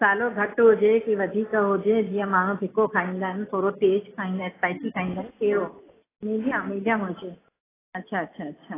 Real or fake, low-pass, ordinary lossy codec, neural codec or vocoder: real; 3.6 kHz; AAC, 16 kbps; none